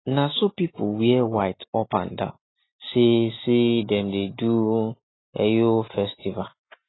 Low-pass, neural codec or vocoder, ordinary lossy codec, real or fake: 7.2 kHz; none; AAC, 16 kbps; real